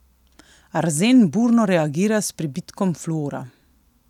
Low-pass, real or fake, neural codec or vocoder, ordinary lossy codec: 19.8 kHz; real; none; none